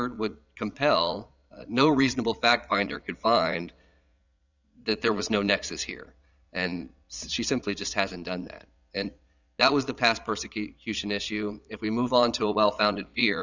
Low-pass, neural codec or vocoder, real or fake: 7.2 kHz; vocoder, 22.05 kHz, 80 mel bands, Vocos; fake